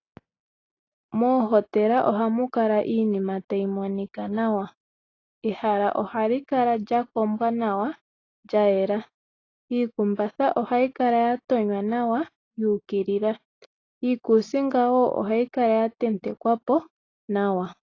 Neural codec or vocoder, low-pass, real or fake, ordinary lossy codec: none; 7.2 kHz; real; AAC, 32 kbps